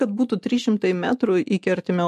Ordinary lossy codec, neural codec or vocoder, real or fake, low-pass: MP3, 64 kbps; none; real; 14.4 kHz